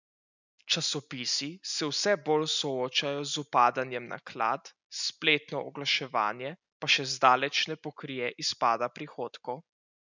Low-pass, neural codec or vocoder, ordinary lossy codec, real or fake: 7.2 kHz; none; none; real